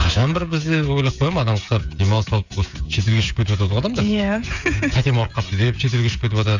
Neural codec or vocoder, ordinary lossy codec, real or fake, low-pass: codec, 16 kHz, 16 kbps, FreqCodec, smaller model; none; fake; 7.2 kHz